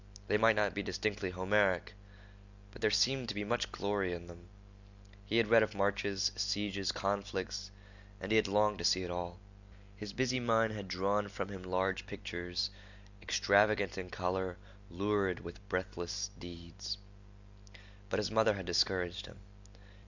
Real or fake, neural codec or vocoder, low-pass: real; none; 7.2 kHz